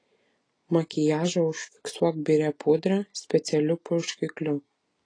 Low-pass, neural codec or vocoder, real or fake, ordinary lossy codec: 9.9 kHz; none; real; AAC, 32 kbps